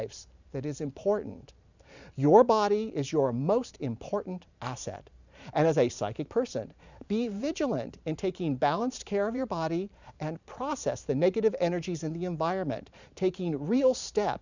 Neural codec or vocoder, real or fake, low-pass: vocoder, 44.1 kHz, 128 mel bands every 512 samples, BigVGAN v2; fake; 7.2 kHz